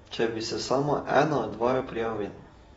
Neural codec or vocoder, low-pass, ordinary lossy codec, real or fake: vocoder, 48 kHz, 128 mel bands, Vocos; 19.8 kHz; AAC, 24 kbps; fake